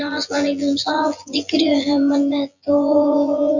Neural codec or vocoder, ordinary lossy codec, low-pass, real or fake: vocoder, 24 kHz, 100 mel bands, Vocos; none; 7.2 kHz; fake